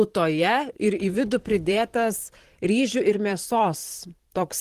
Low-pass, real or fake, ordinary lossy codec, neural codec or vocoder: 14.4 kHz; fake; Opus, 16 kbps; vocoder, 44.1 kHz, 128 mel bands, Pupu-Vocoder